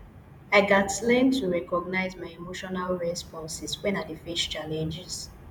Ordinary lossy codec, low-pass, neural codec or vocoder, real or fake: none; none; vocoder, 48 kHz, 128 mel bands, Vocos; fake